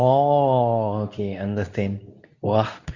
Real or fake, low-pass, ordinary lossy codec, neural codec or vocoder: fake; 7.2 kHz; Opus, 64 kbps; codec, 24 kHz, 0.9 kbps, WavTokenizer, medium speech release version 2